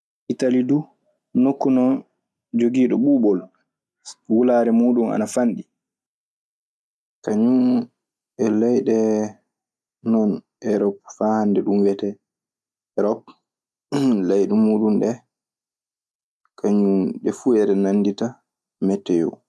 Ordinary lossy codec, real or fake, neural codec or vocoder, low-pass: none; real; none; none